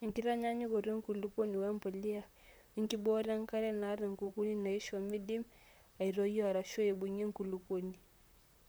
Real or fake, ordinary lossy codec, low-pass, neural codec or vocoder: fake; none; none; vocoder, 44.1 kHz, 128 mel bands, Pupu-Vocoder